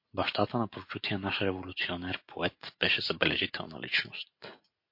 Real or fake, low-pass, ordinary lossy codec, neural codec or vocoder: real; 5.4 kHz; MP3, 32 kbps; none